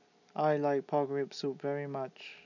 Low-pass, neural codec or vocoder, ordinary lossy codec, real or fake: 7.2 kHz; none; none; real